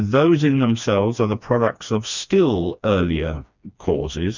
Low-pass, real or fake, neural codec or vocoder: 7.2 kHz; fake; codec, 16 kHz, 2 kbps, FreqCodec, smaller model